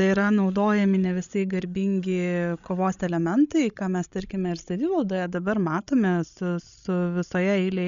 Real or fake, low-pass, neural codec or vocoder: fake; 7.2 kHz; codec, 16 kHz, 16 kbps, FreqCodec, larger model